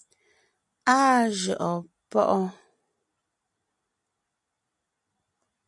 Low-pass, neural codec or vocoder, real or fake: 10.8 kHz; none; real